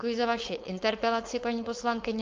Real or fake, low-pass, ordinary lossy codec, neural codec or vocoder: fake; 7.2 kHz; Opus, 24 kbps; codec, 16 kHz, 4.8 kbps, FACodec